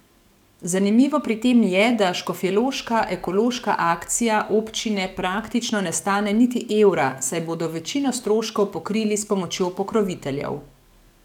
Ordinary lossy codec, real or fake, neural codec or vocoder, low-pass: none; fake; codec, 44.1 kHz, 7.8 kbps, DAC; 19.8 kHz